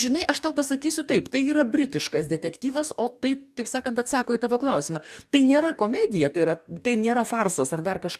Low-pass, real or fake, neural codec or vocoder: 14.4 kHz; fake; codec, 44.1 kHz, 2.6 kbps, DAC